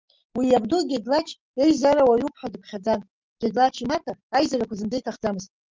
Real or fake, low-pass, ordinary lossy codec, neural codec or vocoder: real; 7.2 kHz; Opus, 24 kbps; none